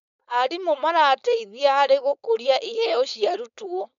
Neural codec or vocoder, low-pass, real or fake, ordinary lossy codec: codec, 16 kHz, 4.8 kbps, FACodec; 7.2 kHz; fake; MP3, 96 kbps